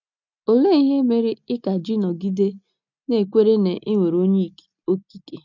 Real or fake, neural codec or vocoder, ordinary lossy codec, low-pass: real; none; none; 7.2 kHz